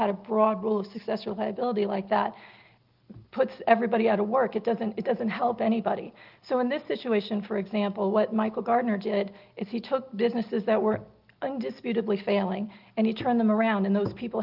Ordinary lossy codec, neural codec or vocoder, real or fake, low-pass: Opus, 32 kbps; none; real; 5.4 kHz